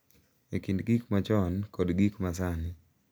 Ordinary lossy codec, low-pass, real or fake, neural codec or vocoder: none; none; real; none